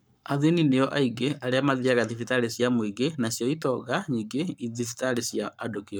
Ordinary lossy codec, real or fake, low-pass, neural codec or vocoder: none; fake; none; codec, 44.1 kHz, 7.8 kbps, Pupu-Codec